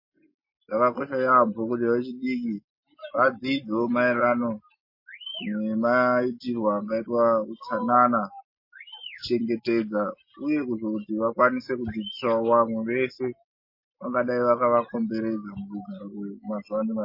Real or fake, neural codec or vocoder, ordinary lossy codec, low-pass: real; none; MP3, 24 kbps; 5.4 kHz